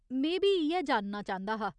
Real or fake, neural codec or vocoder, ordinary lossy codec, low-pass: real; none; none; 9.9 kHz